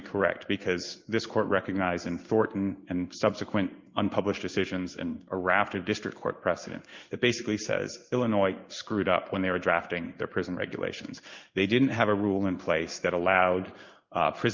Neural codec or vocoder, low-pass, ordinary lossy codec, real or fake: codec, 16 kHz in and 24 kHz out, 1 kbps, XY-Tokenizer; 7.2 kHz; Opus, 24 kbps; fake